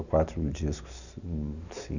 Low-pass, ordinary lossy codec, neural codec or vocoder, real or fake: 7.2 kHz; none; none; real